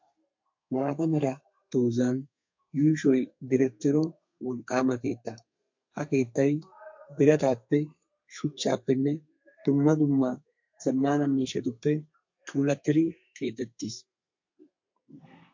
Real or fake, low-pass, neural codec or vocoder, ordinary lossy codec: fake; 7.2 kHz; codec, 32 kHz, 1.9 kbps, SNAC; MP3, 48 kbps